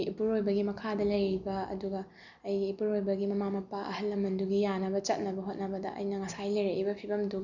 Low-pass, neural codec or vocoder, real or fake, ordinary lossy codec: 7.2 kHz; none; real; none